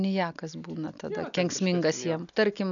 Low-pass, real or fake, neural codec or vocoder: 7.2 kHz; real; none